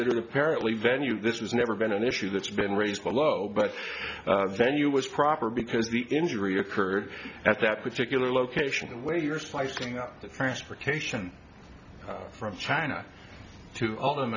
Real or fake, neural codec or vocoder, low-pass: real; none; 7.2 kHz